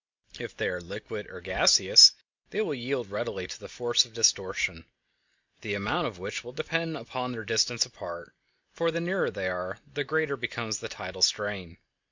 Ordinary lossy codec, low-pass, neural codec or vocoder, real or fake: MP3, 64 kbps; 7.2 kHz; none; real